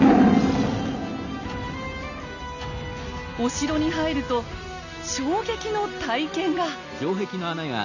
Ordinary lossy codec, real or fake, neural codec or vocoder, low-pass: none; real; none; 7.2 kHz